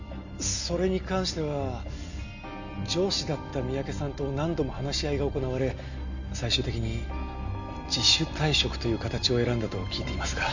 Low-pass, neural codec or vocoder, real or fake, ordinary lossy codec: 7.2 kHz; none; real; none